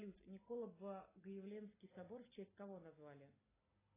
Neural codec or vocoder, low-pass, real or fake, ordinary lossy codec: none; 3.6 kHz; real; AAC, 16 kbps